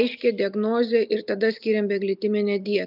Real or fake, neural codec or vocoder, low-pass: real; none; 5.4 kHz